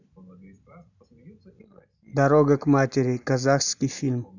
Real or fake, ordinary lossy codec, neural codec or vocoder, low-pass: real; none; none; 7.2 kHz